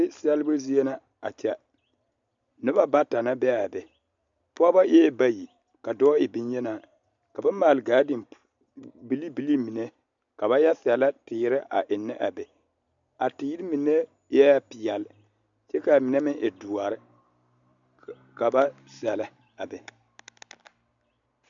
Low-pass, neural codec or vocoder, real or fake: 7.2 kHz; none; real